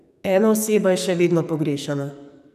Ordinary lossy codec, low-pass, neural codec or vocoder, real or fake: none; 14.4 kHz; codec, 44.1 kHz, 2.6 kbps, SNAC; fake